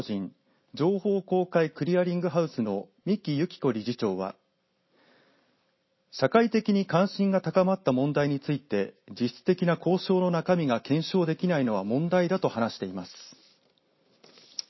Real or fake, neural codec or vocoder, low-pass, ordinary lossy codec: real; none; 7.2 kHz; MP3, 24 kbps